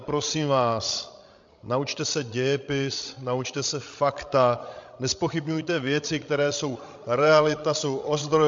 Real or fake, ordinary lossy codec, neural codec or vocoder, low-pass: fake; MP3, 48 kbps; codec, 16 kHz, 16 kbps, FreqCodec, larger model; 7.2 kHz